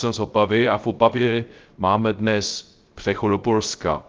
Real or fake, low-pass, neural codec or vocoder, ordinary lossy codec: fake; 7.2 kHz; codec, 16 kHz, 0.3 kbps, FocalCodec; Opus, 32 kbps